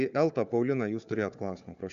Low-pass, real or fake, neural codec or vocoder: 7.2 kHz; fake; codec, 16 kHz, 4 kbps, FunCodec, trained on Chinese and English, 50 frames a second